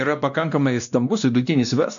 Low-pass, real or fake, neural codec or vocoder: 7.2 kHz; fake; codec, 16 kHz, 1 kbps, X-Codec, WavLM features, trained on Multilingual LibriSpeech